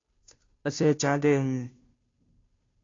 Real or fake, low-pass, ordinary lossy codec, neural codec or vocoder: fake; 7.2 kHz; AAC, 32 kbps; codec, 16 kHz, 0.5 kbps, FunCodec, trained on Chinese and English, 25 frames a second